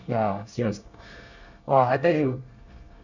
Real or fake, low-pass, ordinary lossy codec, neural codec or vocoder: fake; 7.2 kHz; none; codec, 24 kHz, 1 kbps, SNAC